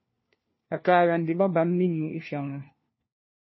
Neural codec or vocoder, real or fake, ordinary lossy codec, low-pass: codec, 16 kHz, 1 kbps, FunCodec, trained on LibriTTS, 50 frames a second; fake; MP3, 24 kbps; 7.2 kHz